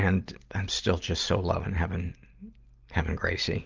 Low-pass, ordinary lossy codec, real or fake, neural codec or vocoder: 7.2 kHz; Opus, 32 kbps; real; none